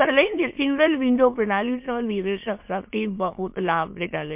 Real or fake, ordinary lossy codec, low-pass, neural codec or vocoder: fake; MP3, 32 kbps; 3.6 kHz; autoencoder, 22.05 kHz, a latent of 192 numbers a frame, VITS, trained on many speakers